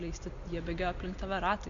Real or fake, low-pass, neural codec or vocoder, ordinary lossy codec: real; 7.2 kHz; none; Opus, 64 kbps